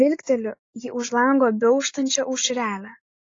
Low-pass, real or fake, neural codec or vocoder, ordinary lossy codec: 7.2 kHz; real; none; AAC, 32 kbps